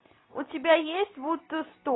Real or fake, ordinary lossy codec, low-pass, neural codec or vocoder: real; AAC, 16 kbps; 7.2 kHz; none